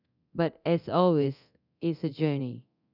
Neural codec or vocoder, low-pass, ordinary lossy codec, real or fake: codec, 24 kHz, 0.9 kbps, DualCodec; 5.4 kHz; none; fake